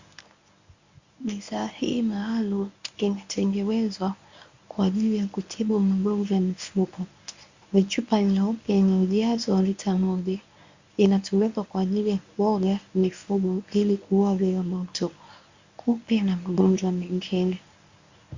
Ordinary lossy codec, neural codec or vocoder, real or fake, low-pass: Opus, 64 kbps; codec, 24 kHz, 0.9 kbps, WavTokenizer, medium speech release version 1; fake; 7.2 kHz